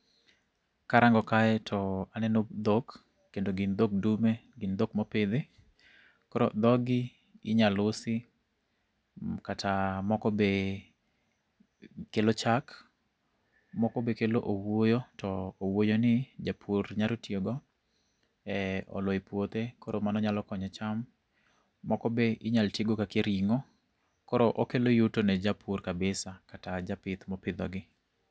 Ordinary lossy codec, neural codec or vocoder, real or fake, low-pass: none; none; real; none